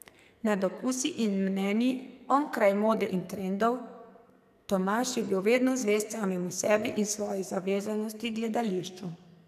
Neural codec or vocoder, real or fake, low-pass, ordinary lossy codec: codec, 44.1 kHz, 2.6 kbps, SNAC; fake; 14.4 kHz; none